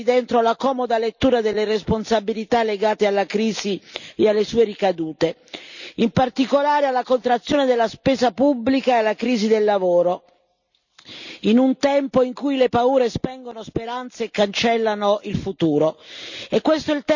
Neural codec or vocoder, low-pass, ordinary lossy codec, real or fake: none; 7.2 kHz; none; real